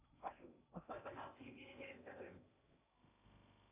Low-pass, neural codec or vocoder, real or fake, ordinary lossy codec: 3.6 kHz; codec, 16 kHz in and 24 kHz out, 0.8 kbps, FocalCodec, streaming, 65536 codes; fake; MP3, 32 kbps